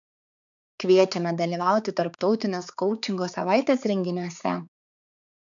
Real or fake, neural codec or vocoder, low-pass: fake; codec, 16 kHz, 4 kbps, X-Codec, HuBERT features, trained on balanced general audio; 7.2 kHz